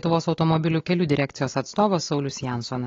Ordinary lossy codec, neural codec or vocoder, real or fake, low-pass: AAC, 32 kbps; none; real; 7.2 kHz